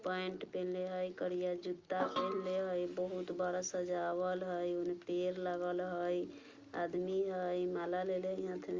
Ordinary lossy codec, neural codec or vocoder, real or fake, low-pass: Opus, 32 kbps; none; real; 7.2 kHz